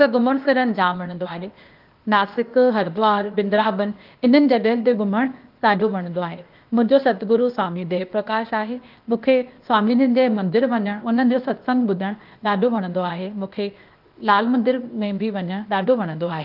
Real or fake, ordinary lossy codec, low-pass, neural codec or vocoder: fake; Opus, 32 kbps; 5.4 kHz; codec, 16 kHz, 0.8 kbps, ZipCodec